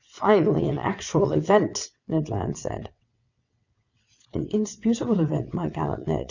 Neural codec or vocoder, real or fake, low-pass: vocoder, 22.05 kHz, 80 mel bands, WaveNeXt; fake; 7.2 kHz